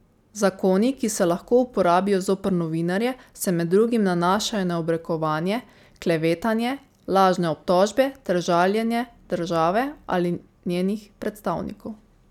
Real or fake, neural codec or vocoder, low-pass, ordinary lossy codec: real; none; 19.8 kHz; none